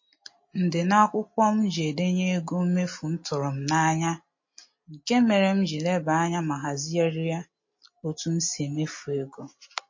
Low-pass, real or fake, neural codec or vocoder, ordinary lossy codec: 7.2 kHz; real; none; MP3, 32 kbps